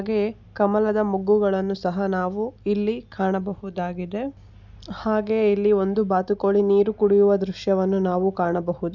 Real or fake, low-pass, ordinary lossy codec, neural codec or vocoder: real; 7.2 kHz; none; none